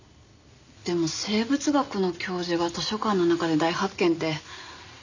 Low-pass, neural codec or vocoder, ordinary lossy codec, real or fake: 7.2 kHz; none; none; real